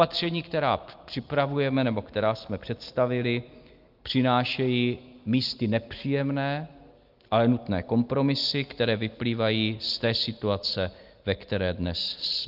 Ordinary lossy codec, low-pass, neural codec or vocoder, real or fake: Opus, 24 kbps; 5.4 kHz; none; real